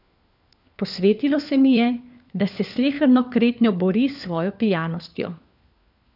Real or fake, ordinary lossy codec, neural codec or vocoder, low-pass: fake; none; codec, 16 kHz, 4 kbps, FunCodec, trained on LibriTTS, 50 frames a second; 5.4 kHz